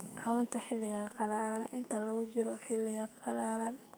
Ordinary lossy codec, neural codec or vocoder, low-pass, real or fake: none; codec, 44.1 kHz, 2.6 kbps, SNAC; none; fake